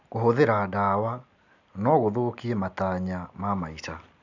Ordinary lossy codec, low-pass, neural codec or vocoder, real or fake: none; 7.2 kHz; none; real